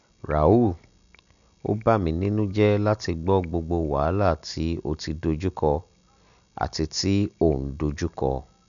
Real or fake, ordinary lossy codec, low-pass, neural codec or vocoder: real; none; 7.2 kHz; none